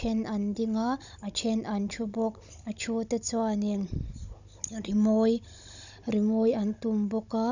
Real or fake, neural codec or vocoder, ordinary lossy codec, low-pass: fake; codec, 16 kHz, 16 kbps, FunCodec, trained on Chinese and English, 50 frames a second; none; 7.2 kHz